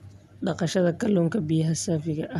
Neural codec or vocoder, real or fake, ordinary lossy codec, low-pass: none; real; none; 14.4 kHz